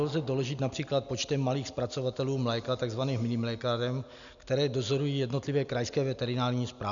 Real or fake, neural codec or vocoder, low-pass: real; none; 7.2 kHz